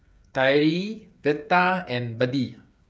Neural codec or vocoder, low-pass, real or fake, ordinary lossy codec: codec, 16 kHz, 8 kbps, FreqCodec, smaller model; none; fake; none